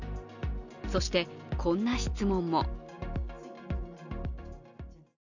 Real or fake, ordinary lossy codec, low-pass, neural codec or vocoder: real; none; 7.2 kHz; none